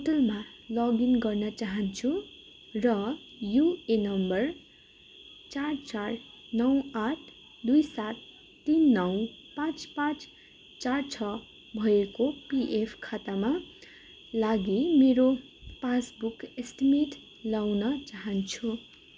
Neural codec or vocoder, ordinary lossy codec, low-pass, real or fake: none; none; none; real